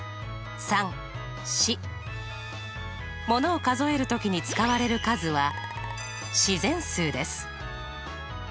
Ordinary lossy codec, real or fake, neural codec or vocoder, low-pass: none; real; none; none